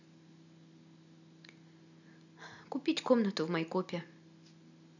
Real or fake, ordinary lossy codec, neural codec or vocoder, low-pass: real; none; none; 7.2 kHz